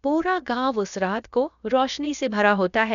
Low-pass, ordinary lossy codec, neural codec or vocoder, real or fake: 7.2 kHz; none; codec, 16 kHz, about 1 kbps, DyCAST, with the encoder's durations; fake